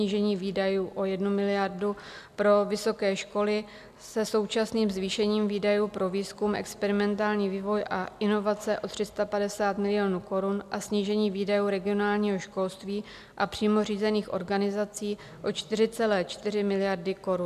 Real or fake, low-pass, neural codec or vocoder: real; 14.4 kHz; none